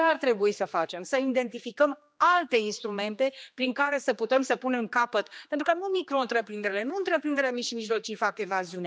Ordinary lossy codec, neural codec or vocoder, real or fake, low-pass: none; codec, 16 kHz, 2 kbps, X-Codec, HuBERT features, trained on general audio; fake; none